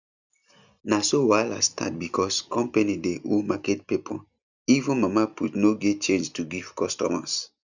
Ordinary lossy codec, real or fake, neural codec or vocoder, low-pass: none; real; none; 7.2 kHz